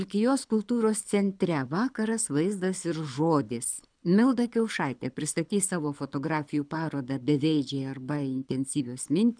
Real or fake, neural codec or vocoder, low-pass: fake; codec, 24 kHz, 6 kbps, HILCodec; 9.9 kHz